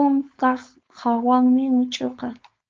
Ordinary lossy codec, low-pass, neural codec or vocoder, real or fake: Opus, 24 kbps; 7.2 kHz; codec, 16 kHz, 4.8 kbps, FACodec; fake